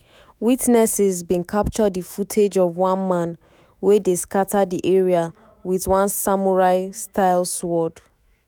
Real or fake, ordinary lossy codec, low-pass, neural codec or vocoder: fake; none; none; autoencoder, 48 kHz, 128 numbers a frame, DAC-VAE, trained on Japanese speech